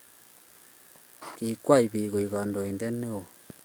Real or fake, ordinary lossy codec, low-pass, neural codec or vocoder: fake; none; none; codec, 44.1 kHz, 7.8 kbps, DAC